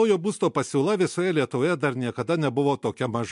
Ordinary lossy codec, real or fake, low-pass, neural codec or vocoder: MP3, 64 kbps; real; 10.8 kHz; none